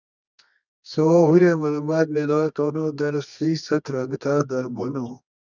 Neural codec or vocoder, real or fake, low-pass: codec, 24 kHz, 0.9 kbps, WavTokenizer, medium music audio release; fake; 7.2 kHz